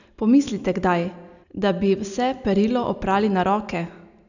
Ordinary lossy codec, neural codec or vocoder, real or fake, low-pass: none; none; real; 7.2 kHz